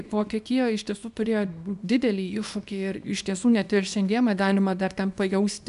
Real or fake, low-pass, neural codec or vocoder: fake; 10.8 kHz; codec, 24 kHz, 0.9 kbps, WavTokenizer, small release